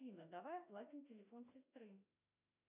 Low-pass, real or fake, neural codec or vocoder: 3.6 kHz; fake; autoencoder, 48 kHz, 32 numbers a frame, DAC-VAE, trained on Japanese speech